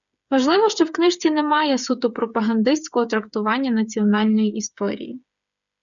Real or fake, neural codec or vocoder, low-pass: fake; codec, 16 kHz, 8 kbps, FreqCodec, smaller model; 7.2 kHz